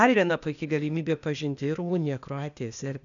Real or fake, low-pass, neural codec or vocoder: fake; 7.2 kHz; codec, 16 kHz, 0.8 kbps, ZipCodec